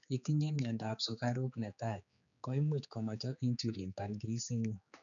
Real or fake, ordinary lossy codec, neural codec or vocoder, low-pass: fake; none; codec, 16 kHz, 4 kbps, X-Codec, HuBERT features, trained on general audio; 7.2 kHz